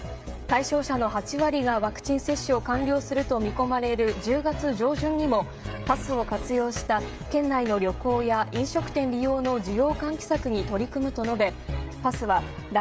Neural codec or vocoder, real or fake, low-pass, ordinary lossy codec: codec, 16 kHz, 16 kbps, FreqCodec, smaller model; fake; none; none